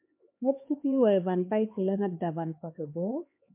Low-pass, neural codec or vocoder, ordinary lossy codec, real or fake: 3.6 kHz; codec, 16 kHz, 4 kbps, X-Codec, HuBERT features, trained on LibriSpeech; MP3, 24 kbps; fake